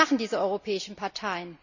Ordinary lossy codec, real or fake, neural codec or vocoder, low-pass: none; real; none; 7.2 kHz